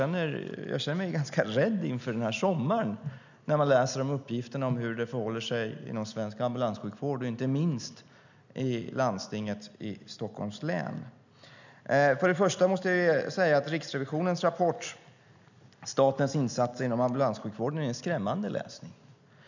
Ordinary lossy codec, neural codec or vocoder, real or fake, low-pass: none; none; real; 7.2 kHz